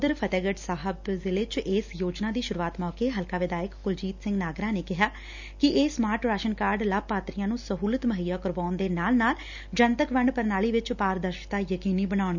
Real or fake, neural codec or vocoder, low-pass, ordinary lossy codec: real; none; 7.2 kHz; none